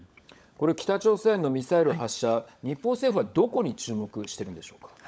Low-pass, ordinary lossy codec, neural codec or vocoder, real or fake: none; none; codec, 16 kHz, 16 kbps, FunCodec, trained on LibriTTS, 50 frames a second; fake